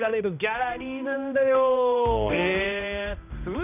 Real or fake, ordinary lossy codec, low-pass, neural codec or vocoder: fake; none; 3.6 kHz; codec, 16 kHz, 1 kbps, X-Codec, HuBERT features, trained on balanced general audio